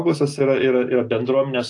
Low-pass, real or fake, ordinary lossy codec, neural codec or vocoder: 10.8 kHz; real; AAC, 48 kbps; none